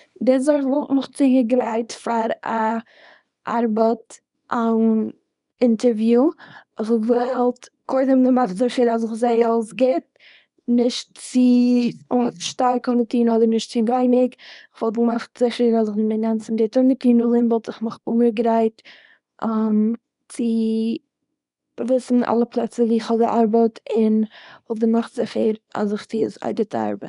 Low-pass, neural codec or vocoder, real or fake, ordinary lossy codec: 10.8 kHz; codec, 24 kHz, 0.9 kbps, WavTokenizer, small release; fake; none